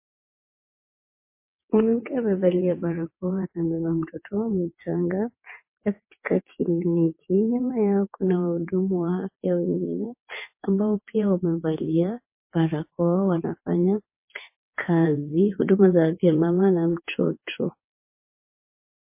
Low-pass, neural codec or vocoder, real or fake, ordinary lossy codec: 3.6 kHz; vocoder, 22.05 kHz, 80 mel bands, WaveNeXt; fake; MP3, 24 kbps